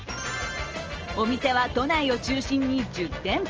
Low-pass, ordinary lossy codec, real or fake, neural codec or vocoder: 7.2 kHz; Opus, 24 kbps; real; none